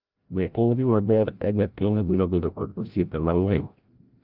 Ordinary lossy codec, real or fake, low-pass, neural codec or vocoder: Opus, 24 kbps; fake; 5.4 kHz; codec, 16 kHz, 0.5 kbps, FreqCodec, larger model